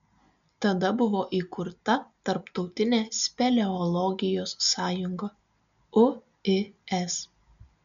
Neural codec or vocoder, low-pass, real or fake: none; 7.2 kHz; real